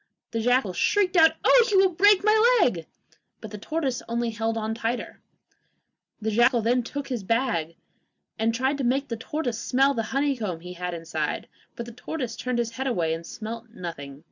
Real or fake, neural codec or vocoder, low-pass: real; none; 7.2 kHz